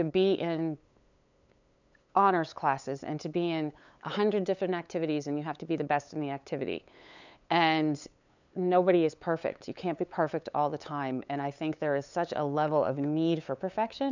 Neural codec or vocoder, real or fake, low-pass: codec, 16 kHz, 8 kbps, FunCodec, trained on LibriTTS, 25 frames a second; fake; 7.2 kHz